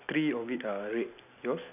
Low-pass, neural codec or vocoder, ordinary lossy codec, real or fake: 3.6 kHz; none; none; real